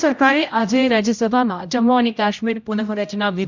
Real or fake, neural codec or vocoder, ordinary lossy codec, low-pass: fake; codec, 16 kHz, 0.5 kbps, X-Codec, HuBERT features, trained on general audio; none; 7.2 kHz